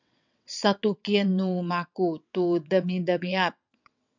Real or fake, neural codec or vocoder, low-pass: fake; vocoder, 22.05 kHz, 80 mel bands, WaveNeXt; 7.2 kHz